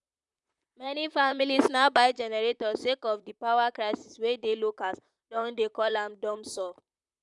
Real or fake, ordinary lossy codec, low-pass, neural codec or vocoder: fake; none; 10.8 kHz; vocoder, 44.1 kHz, 128 mel bands, Pupu-Vocoder